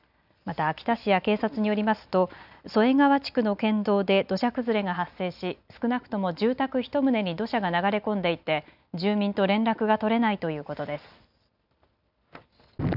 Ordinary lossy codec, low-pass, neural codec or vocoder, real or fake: none; 5.4 kHz; none; real